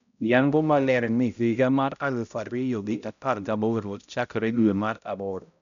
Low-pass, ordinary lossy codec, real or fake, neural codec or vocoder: 7.2 kHz; none; fake; codec, 16 kHz, 0.5 kbps, X-Codec, HuBERT features, trained on balanced general audio